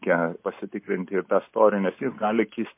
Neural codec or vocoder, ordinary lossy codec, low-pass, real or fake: none; MP3, 24 kbps; 3.6 kHz; real